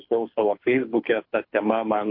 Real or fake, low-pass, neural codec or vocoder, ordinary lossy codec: fake; 5.4 kHz; codec, 24 kHz, 6 kbps, HILCodec; MP3, 32 kbps